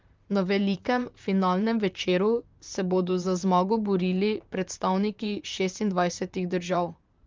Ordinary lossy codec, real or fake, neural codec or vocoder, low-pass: Opus, 24 kbps; fake; vocoder, 44.1 kHz, 128 mel bands, Pupu-Vocoder; 7.2 kHz